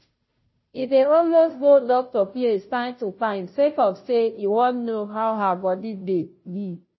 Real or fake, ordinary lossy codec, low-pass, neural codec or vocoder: fake; MP3, 24 kbps; 7.2 kHz; codec, 16 kHz, 0.5 kbps, FunCodec, trained on Chinese and English, 25 frames a second